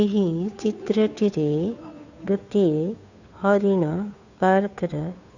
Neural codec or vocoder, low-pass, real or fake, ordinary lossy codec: codec, 16 kHz, 2 kbps, FunCodec, trained on Chinese and English, 25 frames a second; 7.2 kHz; fake; none